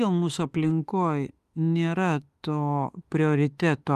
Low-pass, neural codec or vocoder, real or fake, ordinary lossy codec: 14.4 kHz; autoencoder, 48 kHz, 32 numbers a frame, DAC-VAE, trained on Japanese speech; fake; Opus, 32 kbps